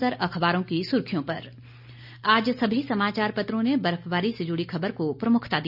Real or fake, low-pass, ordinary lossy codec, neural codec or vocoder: real; 5.4 kHz; none; none